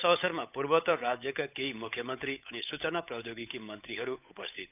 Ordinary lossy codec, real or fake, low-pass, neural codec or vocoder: none; fake; 3.6 kHz; codec, 16 kHz, 8 kbps, FunCodec, trained on Chinese and English, 25 frames a second